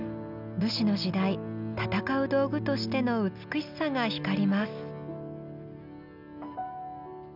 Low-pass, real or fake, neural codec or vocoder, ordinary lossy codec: 5.4 kHz; real; none; none